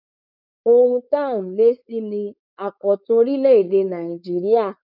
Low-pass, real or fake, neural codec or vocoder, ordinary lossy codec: 5.4 kHz; fake; codec, 16 kHz, 4.8 kbps, FACodec; none